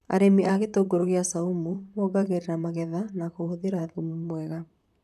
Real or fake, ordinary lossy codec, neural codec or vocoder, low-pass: fake; none; vocoder, 44.1 kHz, 128 mel bands, Pupu-Vocoder; 14.4 kHz